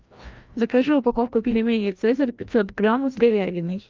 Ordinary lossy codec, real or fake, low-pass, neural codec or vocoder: Opus, 24 kbps; fake; 7.2 kHz; codec, 16 kHz, 1 kbps, FreqCodec, larger model